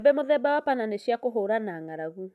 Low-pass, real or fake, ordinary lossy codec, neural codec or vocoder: 14.4 kHz; real; none; none